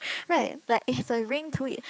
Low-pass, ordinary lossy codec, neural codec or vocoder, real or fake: none; none; codec, 16 kHz, 4 kbps, X-Codec, HuBERT features, trained on general audio; fake